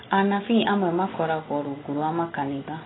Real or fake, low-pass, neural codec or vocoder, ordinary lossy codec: real; 7.2 kHz; none; AAC, 16 kbps